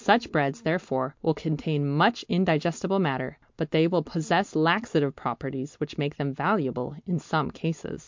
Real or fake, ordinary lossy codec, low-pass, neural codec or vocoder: real; MP3, 64 kbps; 7.2 kHz; none